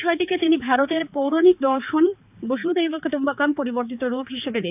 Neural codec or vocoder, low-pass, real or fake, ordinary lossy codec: codec, 16 kHz, 4 kbps, X-Codec, HuBERT features, trained on balanced general audio; 3.6 kHz; fake; AAC, 32 kbps